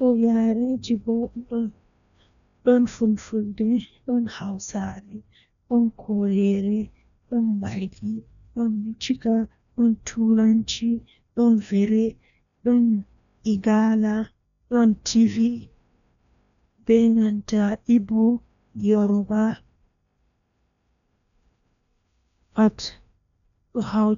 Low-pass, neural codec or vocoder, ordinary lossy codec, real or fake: 7.2 kHz; codec, 16 kHz, 1 kbps, FreqCodec, larger model; none; fake